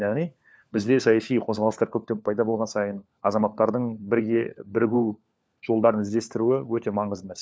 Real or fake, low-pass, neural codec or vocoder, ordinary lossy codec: fake; none; codec, 16 kHz, 4 kbps, FunCodec, trained on LibriTTS, 50 frames a second; none